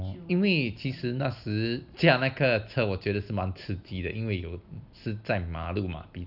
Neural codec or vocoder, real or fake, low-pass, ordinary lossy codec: none; real; 5.4 kHz; none